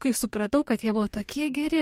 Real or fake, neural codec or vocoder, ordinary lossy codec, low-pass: fake; codec, 44.1 kHz, 2.6 kbps, DAC; MP3, 64 kbps; 19.8 kHz